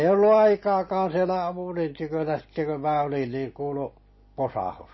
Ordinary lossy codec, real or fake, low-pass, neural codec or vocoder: MP3, 24 kbps; real; 7.2 kHz; none